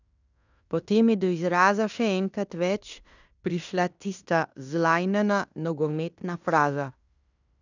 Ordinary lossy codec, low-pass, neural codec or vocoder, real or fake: none; 7.2 kHz; codec, 16 kHz in and 24 kHz out, 0.9 kbps, LongCat-Audio-Codec, fine tuned four codebook decoder; fake